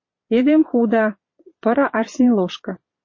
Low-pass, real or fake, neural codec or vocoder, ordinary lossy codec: 7.2 kHz; fake; vocoder, 22.05 kHz, 80 mel bands, Vocos; MP3, 32 kbps